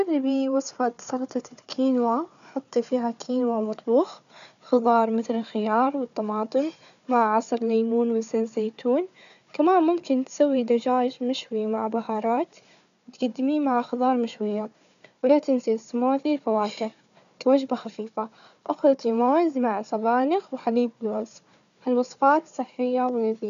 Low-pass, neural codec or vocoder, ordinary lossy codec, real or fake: 7.2 kHz; codec, 16 kHz, 4 kbps, FunCodec, trained on Chinese and English, 50 frames a second; none; fake